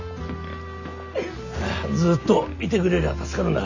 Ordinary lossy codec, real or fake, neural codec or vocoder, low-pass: none; real; none; 7.2 kHz